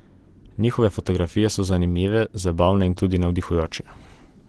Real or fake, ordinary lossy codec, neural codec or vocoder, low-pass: real; Opus, 16 kbps; none; 10.8 kHz